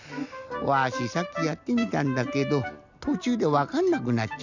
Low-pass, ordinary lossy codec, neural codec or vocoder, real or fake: 7.2 kHz; none; none; real